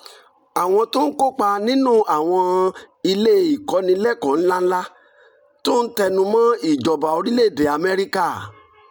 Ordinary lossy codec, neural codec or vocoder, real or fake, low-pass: none; none; real; none